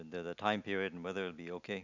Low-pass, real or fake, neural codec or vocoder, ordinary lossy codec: 7.2 kHz; real; none; none